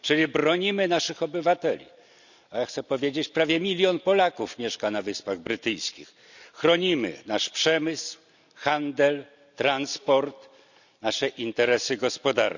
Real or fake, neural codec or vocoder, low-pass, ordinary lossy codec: real; none; 7.2 kHz; none